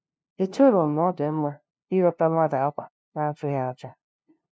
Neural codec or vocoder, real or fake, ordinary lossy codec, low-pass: codec, 16 kHz, 0.5 kbps, FunCodec, trained on LibriTTS, 25 frames a second; fake; none; none